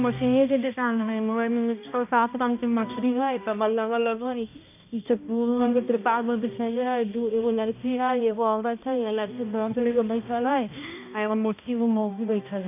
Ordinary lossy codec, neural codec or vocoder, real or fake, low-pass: none; codec, 16 kHz, 1 kbps, X-Codec, HuBERT features, trained on balanced general audio; fake; 3.6 kHz